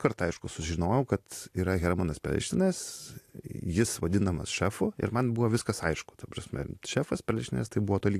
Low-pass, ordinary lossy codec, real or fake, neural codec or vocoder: 14.4 kHz; AAC, 64 kbps; real; none